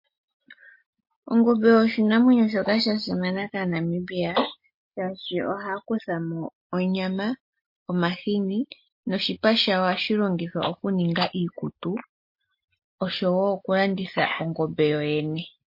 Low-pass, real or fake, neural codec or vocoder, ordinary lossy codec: 5.4 kHz; real; none; MP3, 32 kbps